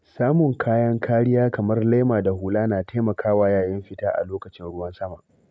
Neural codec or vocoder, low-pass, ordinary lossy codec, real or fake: none; none; none; real